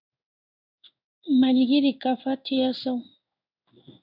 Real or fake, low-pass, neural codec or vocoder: fake; 5.4 kHz; codec, 16 kHz in and 24 kHz out, 1 kbps, XY-Tokenizer